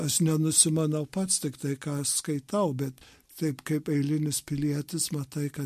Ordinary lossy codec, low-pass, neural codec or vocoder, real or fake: MP3, 64 kbps; 14.4 kHz; none; real